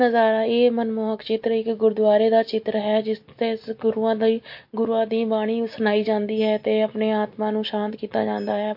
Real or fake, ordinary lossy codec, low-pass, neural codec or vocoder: real; MP3, 32 kbps; 5.4 kHz; none